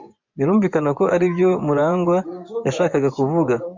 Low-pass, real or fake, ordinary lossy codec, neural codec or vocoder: 7.2 kHz; fake; MP3, 64 kbps; vocoder, 24 kHz, 100 mel bands, Vocos